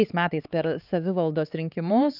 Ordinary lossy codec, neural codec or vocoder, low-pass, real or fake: Opus, 24 kbps; codec, 16 kHz, 4 kbps, X-Codec, HuBERT features, trained on LibriSpeech; 5.4 kHz; fake